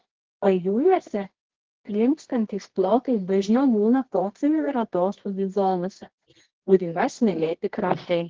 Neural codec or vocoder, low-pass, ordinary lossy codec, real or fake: codec, 24 kHz, 0.9 kbps, WavTokenizer, medium music audio release; 7.2 kHz; Opus, 16 kbps; fake